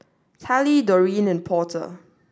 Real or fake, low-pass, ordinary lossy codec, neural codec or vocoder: real; none; none; none